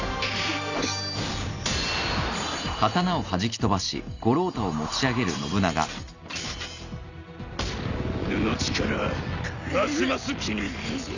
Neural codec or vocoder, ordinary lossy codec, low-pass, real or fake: none; none; 7.2 kHz; real